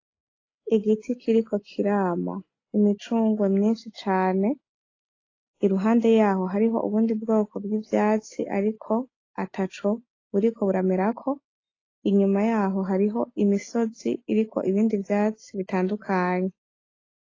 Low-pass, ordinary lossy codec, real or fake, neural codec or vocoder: 7.2 kHz; AAC, 32 kbps; real; none